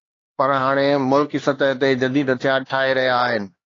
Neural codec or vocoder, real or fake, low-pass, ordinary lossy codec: codec, 16 kHz, 2 kbps, X-Codec, HuBERT features, trained on LibriSpeech; fake; 7.2 kHz; AAC, 32 kbps